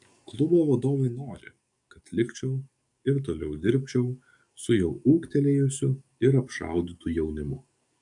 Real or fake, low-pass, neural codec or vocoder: fake; 10.8 kHz; codec, 44.1 kHz, 7.8 kbps, DAC